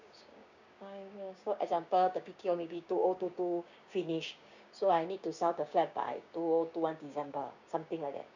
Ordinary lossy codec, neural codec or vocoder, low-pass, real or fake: none; codec, 16 kHz, 6 kbps, DAC; 7.2 kHz; fake